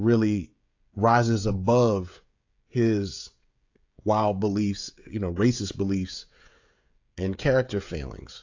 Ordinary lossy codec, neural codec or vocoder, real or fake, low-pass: AAC, 48 kbps; codec, 16 kHz, 16 kbps, FreqCodec, smaller model; fake; 7.2 kHz